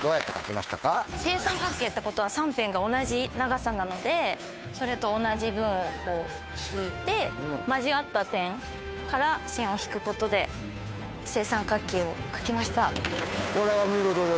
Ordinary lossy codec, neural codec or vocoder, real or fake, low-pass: none; codec, 16 kHz, 2 kbps, FunCodec, trained on Chinese and English, 25 frames a second; fake; none